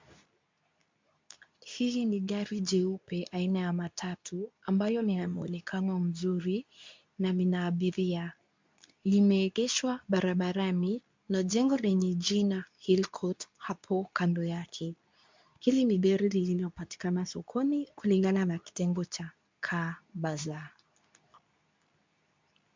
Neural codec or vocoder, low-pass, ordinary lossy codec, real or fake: codec, 24 kHz, 0.9 kbps, WavTokenizer, medium speech release version 2; 7.2 kHz; MP3, 64 kbps; fake